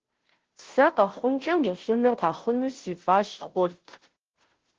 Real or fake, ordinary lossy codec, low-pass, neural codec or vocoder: fake; Opus, 16 kbps; 7.2 kHz; codec, 16 kHz, 0.5 kbps, FunCodec, trained on Chinese and English, 25 frames a second